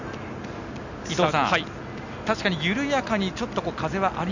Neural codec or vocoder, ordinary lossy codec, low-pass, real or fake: none; none; 7.2 kHz; real